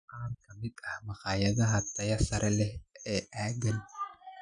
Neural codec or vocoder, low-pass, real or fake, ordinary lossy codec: none; 9.9 kHz; real; none